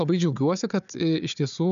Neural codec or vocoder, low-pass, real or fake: codec, 16 kHz, 4 kbps, FunCodec, trained on Chinese and English, 50 frames a second; 7.2 kHz; fake